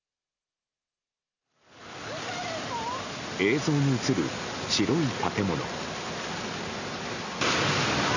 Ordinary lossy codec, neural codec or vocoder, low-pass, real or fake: none; none; 7.2 kHz; real